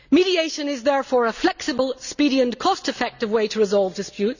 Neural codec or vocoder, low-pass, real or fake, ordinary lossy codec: none; 7.2 kHz; real; none